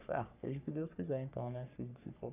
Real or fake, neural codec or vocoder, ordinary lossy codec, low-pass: fake; codec, 44.1 kHz, 3.4 kbps, Pupu-Codec; none; 3.6 kHz